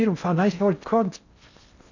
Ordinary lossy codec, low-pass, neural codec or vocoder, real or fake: none; 7.2 kHz; codec, 16 kHz in and 24 kHz out, 0.6 kbps, FocalCodec, streaming, 2048 codes; fake